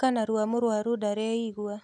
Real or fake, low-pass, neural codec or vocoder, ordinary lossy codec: real; none; none; none